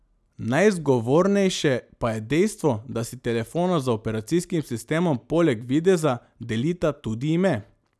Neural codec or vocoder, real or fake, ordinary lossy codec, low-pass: none; real; none; none